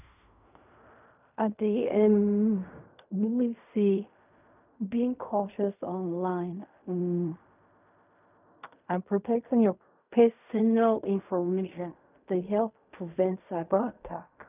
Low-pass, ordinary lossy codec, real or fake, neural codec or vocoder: 3.6 kHz; none; fake; codec, 16 kHz in and 24 kHz out, 0.4 kbps, LongCat-Audio-Codec, fine tuned four codebook decoder